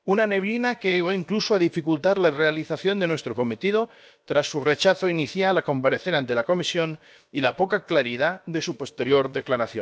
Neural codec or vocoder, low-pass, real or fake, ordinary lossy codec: codec, 16 kHz, about 1 kbps, DyCAST, with the encoder's durations; none; fake; none